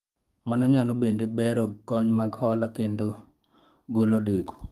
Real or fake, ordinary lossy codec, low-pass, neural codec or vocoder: fake; Opus, 32 kbps; 14.4 kHz; codec, 32 kHz, 1.9 kbps, SNAC